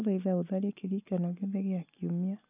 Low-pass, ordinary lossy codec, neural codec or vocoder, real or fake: 3.6 kHz; none; none; real